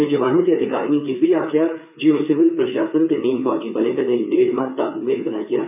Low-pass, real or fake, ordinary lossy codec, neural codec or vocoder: 3.6 kHz; fake; none; codec, 16 kHz, 4 kbps, FreqCodec, larger model